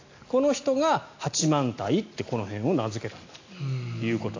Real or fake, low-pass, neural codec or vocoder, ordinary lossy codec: real; 7.2 kHz; none; AAC, 48 kbps